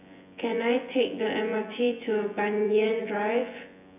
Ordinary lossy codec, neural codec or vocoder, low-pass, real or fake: none; vocoder, 24 kHz, 100 mel bands, Vocos; 3.6 kHz; fake